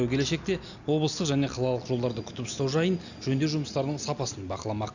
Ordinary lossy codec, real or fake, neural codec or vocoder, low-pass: none; real; none; 7.2 kHz